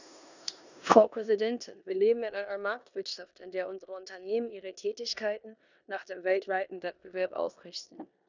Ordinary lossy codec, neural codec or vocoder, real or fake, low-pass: none; codec, 16 kHz in and 24 kHz out, 0.9 kbps, LongCat-Audio-Codec, four codebook decoder; fake; 7.2 kHz